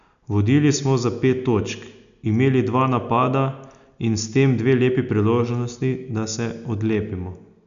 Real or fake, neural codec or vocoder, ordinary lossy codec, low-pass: real; none; none; 7.2 kHz